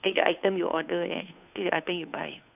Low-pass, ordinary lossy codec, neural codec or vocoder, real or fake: 3.6 kHz; none; codec, 16 kHz, 2 kbps, FunCodec, trained on Chinese and English, 25 frames a second; fake